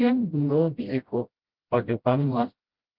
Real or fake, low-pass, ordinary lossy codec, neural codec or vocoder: fake; 5.4 kHz; Opus, 24 kbps; codec, 16 kHz, 0.5 kbps, FreqCodec, smaller model